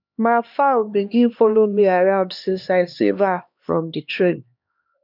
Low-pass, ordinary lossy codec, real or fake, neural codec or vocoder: 5.4 kHz; none; fake; codec, 16 kHz, 2 kbps, X-Codec, HuBERT features, trained on LibriSpeech